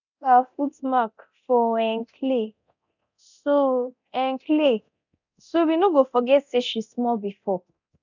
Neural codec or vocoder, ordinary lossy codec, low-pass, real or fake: codec, 24 kHz, 0.9 kbps, DualCodec; none; 7.2 kHz; fake